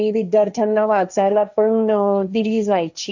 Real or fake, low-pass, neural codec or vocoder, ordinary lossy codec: fake; 7.2 kHz; codec, 16 kHz, 1.1 kbps, Voila-Tokenizer; none